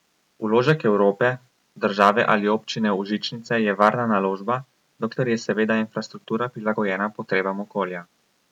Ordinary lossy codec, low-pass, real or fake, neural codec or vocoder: none; 19.8 kHz; real; none